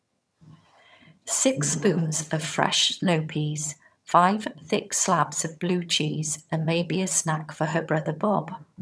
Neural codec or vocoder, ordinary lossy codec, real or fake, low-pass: vocoder, 22.05 kHz, 80 mel bands, HiFi-GAN; none; fake; none